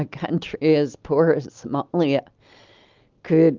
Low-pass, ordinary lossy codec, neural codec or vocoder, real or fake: 7.2 kHz; Opus, 32 kbps; none; real